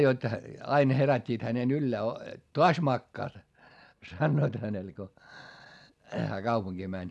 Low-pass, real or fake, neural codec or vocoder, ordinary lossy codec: none; real; none; none